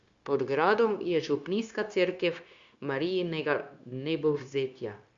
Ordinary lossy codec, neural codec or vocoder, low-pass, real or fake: Opus, 64 kbps; codec, 16 kHz, 0.9 kbps, LongCat-Audio-Codec; 7.2 kHz; fake